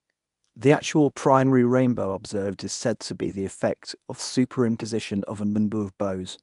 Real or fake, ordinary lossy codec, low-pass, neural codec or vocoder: fake; none; 10.8 kHz; codec, 24 kHz, 0.9 kbps, WavTokenizer, medium speech release version 1